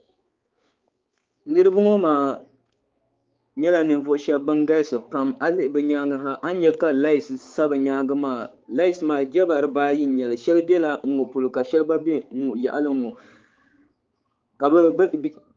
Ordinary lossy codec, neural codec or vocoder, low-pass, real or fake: Opus, 32 kbps; codec, 16 kHz, 4 kbps, X-Codec, HuBERT features, trained on balanced general audio; 7.2 kHz; fake